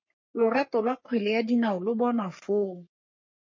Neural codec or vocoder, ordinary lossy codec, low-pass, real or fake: codec, 44.1 kHz, 3.4 kbps, Pupu-Codec; MP3, 32 kbps; 7.2 kHz; fake